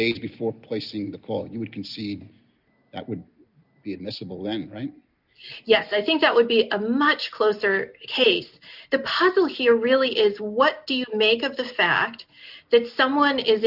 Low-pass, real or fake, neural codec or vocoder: 5.4 kHz; real; none